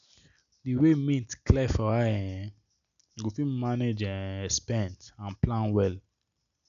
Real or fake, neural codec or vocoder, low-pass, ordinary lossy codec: real; none; 7.2 kHz; none